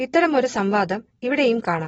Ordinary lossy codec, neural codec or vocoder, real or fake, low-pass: AAC, 24 kbps; none; real; 10.8 kHz